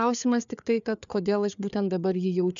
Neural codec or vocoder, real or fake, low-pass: codec, 16 kHz, 4 kbps, FreqCodec, larger model; fake; 7.2 kHz